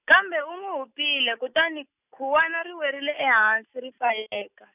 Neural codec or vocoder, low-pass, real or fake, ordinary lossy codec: none; 3.6 kHz; real; none